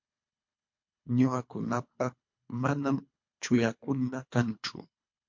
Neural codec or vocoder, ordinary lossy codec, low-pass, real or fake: codec, 24 kHz, 3 kbps, HILCodec; MP3, 48 kbps; 7.2 kHz; fake